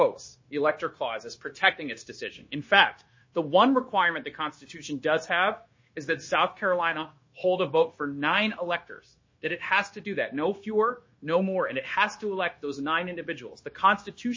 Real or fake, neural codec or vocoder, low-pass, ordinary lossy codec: fake; codec, 16 kHz, 0.9 kbps, LongCat-Audio-Codec; 7.2 kHz; MP3, 32 kbps